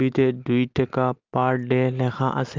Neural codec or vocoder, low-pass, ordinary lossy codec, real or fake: none; 7.2 kHz; Opus, 32 kbps; real